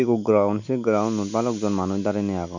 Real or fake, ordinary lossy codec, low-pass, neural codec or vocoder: real; none; 7.2 kHz; none